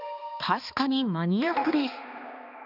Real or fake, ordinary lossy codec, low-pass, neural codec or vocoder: fake; none; 5.4 kHz; codec, 16 kHz, 2 kbps, X-Codec, HuBERT features, trained on balanced general audio